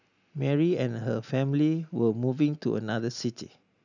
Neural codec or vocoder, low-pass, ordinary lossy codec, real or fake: none; 7.2 kHz; none; real